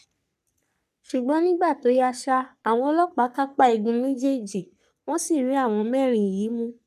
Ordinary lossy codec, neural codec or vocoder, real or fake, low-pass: none; codec, 44.1 kHz, 3.4 kbps, Pupu-Codec; fake; 14.4 kHz